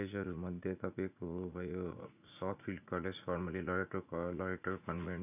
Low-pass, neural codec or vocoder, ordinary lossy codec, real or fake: 3.6 kHz; vocoder, 44.1 kHz, 80 mel bands, Vocos; none; fake